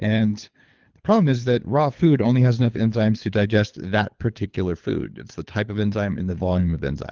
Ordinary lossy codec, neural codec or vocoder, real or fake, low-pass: Opus, 32 kbps; codec, 24 kHz, 3 kbps, HILCodec; fake; 7.2 kHz